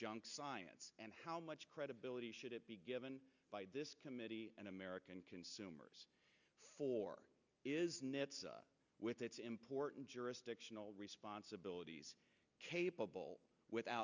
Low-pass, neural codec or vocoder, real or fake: 7.2 kHz; none; real